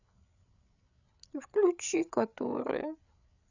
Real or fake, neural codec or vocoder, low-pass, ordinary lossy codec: fake; codec, 16 kHz, 8 kbps, FreqCodec, larger model; 7.2 kHz; none